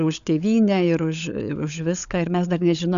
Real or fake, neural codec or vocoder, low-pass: fake; codec, 16 kHz, 16 kbps, FunCodec, trained on LibriTTS, 50 frames a second; 7.2 kHz